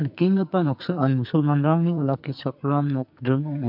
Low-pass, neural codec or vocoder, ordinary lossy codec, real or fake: 5.4 kHz; codec, 44.1 kHz, 2.6 kbps, SNAC; MP3, 48 kbps; fake